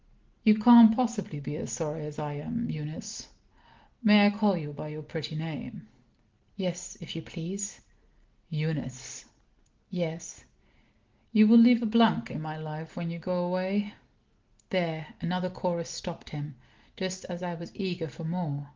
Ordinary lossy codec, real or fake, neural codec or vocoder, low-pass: Opus, 16 kbps; real; none; 7.2 kHz